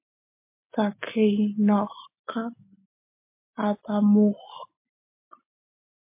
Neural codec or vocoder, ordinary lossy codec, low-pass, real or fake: none; MP3, 24 kbps; 3.6 kHz; real